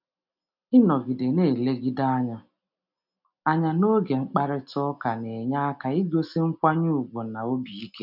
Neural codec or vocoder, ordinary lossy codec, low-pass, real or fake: none; none; 5.4 kHz; real